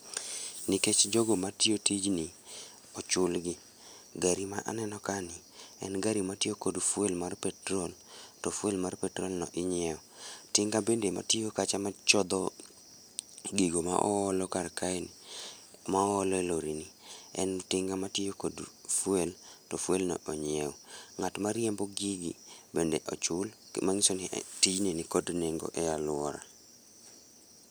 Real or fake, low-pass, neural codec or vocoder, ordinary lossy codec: real; none; none; none